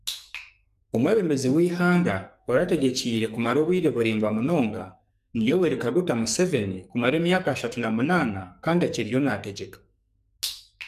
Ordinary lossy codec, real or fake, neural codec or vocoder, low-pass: none; fake; codec, 44.1 kHz, 2.6 kbps, SNAC; 14.4 kHz